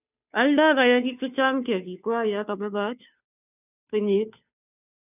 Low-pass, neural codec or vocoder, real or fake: 3.6 kHz; codec, 16 kHz, 2 kbps, FunCodec, trained on Chinese and English, 25 frames a second; fake